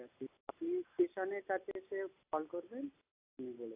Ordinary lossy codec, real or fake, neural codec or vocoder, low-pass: AAC, 24 kbps; real; none; 3.6 kHz